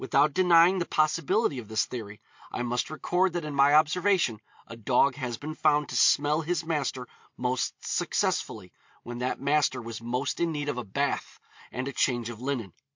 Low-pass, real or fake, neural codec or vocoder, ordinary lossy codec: 7.2 kHz; real; none; MP3, 48 kbps